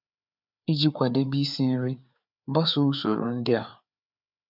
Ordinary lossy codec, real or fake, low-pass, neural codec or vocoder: none; fake; 5.4 kHz; codec, 16 kHz, 4 kbps, FreqCodec, larger model